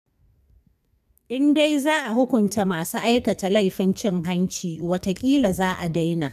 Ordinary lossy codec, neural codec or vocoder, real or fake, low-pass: none; codec, 44.1 kHz, 2.6 kbps, SNAC; fake; 14.4 kHz